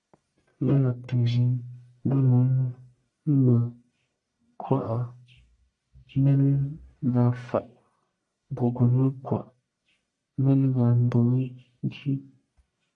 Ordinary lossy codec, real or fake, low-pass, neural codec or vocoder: AAC, 64 kbps; fake; 10.8 kHz; codec, 44.1 kHz, 1.7 kbps, Pupu-Codec